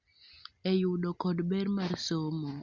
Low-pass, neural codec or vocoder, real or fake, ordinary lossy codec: 7.2 kHz; none; real; Opus, 64 kbps